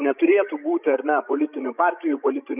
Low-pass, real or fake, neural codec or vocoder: 3.6 kHz; fake; codec, 16 kHz, 16 kbps, FreqCodec, larger model